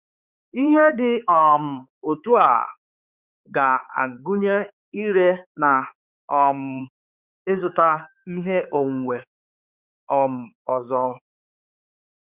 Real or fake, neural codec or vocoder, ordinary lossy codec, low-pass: fake; codec, 16 kHz, 4 kbps, X-Codec, HuBERT features, trained on balanced general audio; Opus, 64 kbps; 3.6 kHz